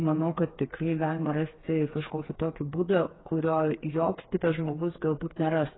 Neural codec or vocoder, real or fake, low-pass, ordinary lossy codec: codec, 16 kHz, 4 kbps, FreqCodec, smaller model; fake; 7.2 kHz; AAC, 16 kbps